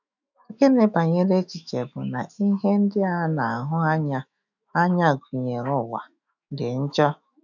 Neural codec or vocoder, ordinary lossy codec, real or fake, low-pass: autoencoder, 48 kHz, 128 numbers a frame, DAC-VAE, trained on Japanese speech; none; fake; 7.2 kHz